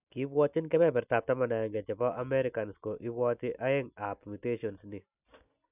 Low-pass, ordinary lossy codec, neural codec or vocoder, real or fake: 3.6 kHz; none; none; real